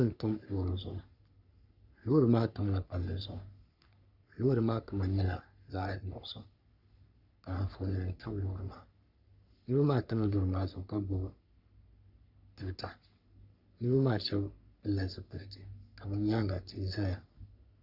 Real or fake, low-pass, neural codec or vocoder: fake; 5.4 kHz; codec, 44.1 kHz, 3.4 kbps, Pupu-Codec